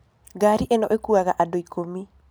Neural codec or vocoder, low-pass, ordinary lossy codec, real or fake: none; none; none; real